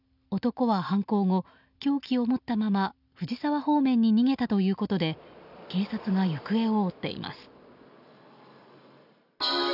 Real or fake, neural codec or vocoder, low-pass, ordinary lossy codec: real; none; 5.4 kHz; none